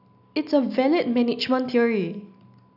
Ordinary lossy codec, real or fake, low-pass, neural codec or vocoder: none; real; 5.4 kHz; none